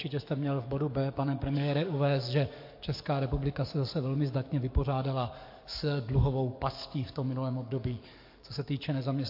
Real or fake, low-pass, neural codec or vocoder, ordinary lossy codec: fake; 5.4 kHz; codec, 44.1 kHz, 7.8 kbps, DAC; MP3, 32 kbps